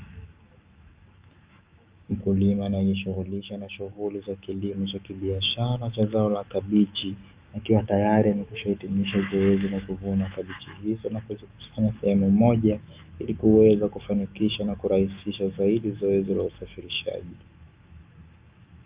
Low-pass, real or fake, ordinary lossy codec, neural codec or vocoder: 3.6 kHz; fake; Opus, 24 kbps; autoencoder, 48 kHz, 128 numbers a frame, DAC-VAE, trained on Japanese speech